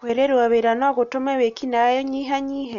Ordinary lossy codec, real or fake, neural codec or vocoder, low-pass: none; real; none; 7.2 kHz